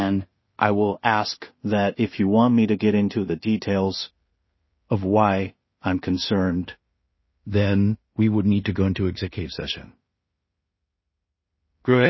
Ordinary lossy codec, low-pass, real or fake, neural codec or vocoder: MP3, 24 kbps; 7.2 kHz; fake; codec, 16 kHz in and 24 kHz out, 0.4 kbps, LongCat-Audio-Codec, two codebook decoder